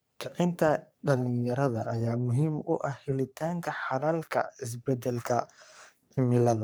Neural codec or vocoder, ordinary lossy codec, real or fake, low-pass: codec, 44.1 kHz, 3.4 kbps, Pupu-Codec; none; fake; none